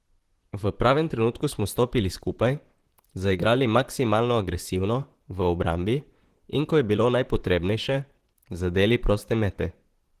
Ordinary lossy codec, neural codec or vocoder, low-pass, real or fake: Opus, 16 kbps; vocoder, 44.1 kHz, 128 mel bands, Pupu-Vocoder; 14.4 kHz; fake